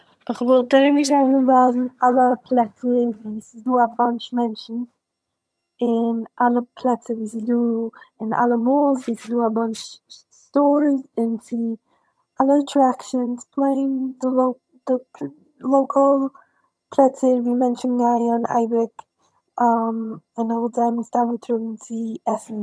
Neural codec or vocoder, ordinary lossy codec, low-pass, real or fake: vocoder, 22.05 kHz, 80 mel bands, HiFi-GAN; none; none; fake